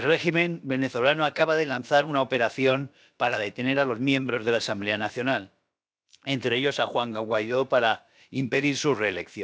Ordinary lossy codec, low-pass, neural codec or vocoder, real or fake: none; none; codec, 16 kHz, about 1 kbps, DyCAST, with the encoder's durations; fake